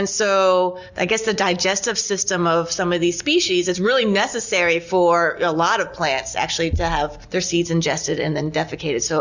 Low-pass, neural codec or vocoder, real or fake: 7.2 kHz; none; real